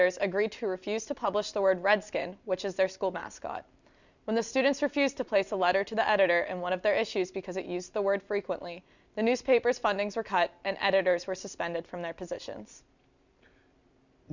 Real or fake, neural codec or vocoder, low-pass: real; none; 7.2 kHz